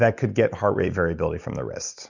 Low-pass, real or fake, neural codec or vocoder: 7.2 kHz; real; none